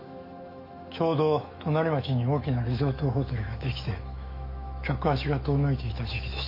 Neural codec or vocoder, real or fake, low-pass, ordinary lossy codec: autoencoder, 48 kHz, 128 numbers a frame, DAC-VAE, trained on Japanese speech; fake; 5.4 kHz; MP3, 32 kbps